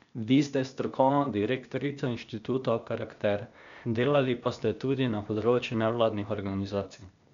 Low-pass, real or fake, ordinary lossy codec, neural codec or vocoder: 7.2 kHz; fake; none; codec, 16 kHz, 0.8 kbps, ZipCodec